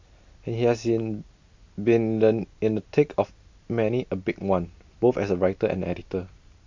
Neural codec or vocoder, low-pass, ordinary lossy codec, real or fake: none; 7.2 kHz; MP3, 64 kbps; real